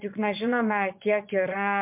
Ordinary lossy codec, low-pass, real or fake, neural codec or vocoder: MP3, 32 kbps; 3.6 kHz; fake; vocoder, 22.05 kHz, 80 mel bands, Vocos